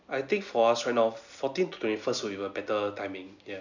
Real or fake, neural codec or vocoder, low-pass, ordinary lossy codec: real; none; 7.2 kHz; none